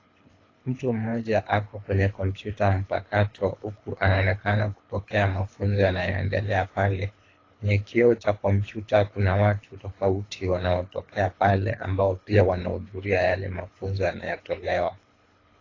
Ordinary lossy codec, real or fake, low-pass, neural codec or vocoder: AAC, 32 kbps; fake; 7.2 kHz; codec, 24 kHz, 3 kbps, HILCodec